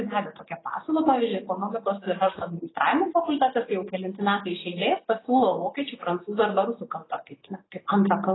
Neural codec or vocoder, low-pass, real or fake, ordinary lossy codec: none; 7.2 kHz; real; AAC, 16 kbps